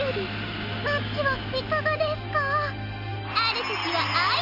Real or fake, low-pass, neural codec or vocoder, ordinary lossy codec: real; 5.4 kHz; none; none